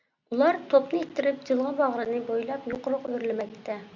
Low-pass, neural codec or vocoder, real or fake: 7.2 kHz; none; real